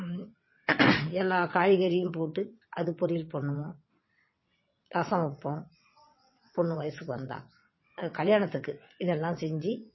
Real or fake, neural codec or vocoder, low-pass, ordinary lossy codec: fake; vocoder, 22.05 kHz, 80 mel bands, WaveNeXt; 7.2 kHz; MP3, 24 kbps